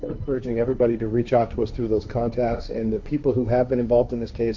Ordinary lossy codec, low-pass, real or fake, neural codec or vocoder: MP3, 64 kbps; 7.2 kHz; fake; codec, 16 kHz, 1.1 kbps, Voila-Tokenizer